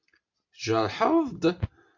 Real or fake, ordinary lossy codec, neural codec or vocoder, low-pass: real; MP3, 48 kbps; none; 7.2 kHz